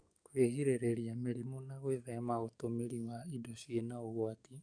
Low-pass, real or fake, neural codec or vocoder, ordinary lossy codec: 9.9 kHz; fake; codec, 44.1 kHz, 7.8 kbps, DAC; none